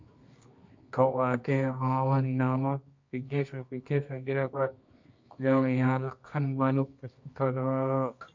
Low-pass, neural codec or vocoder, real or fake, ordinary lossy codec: 7.2 kHz; codec, 24 kHz, 0.9 kbps, WavTokenizer, medium music audio release; fake; MP3, 48 kbps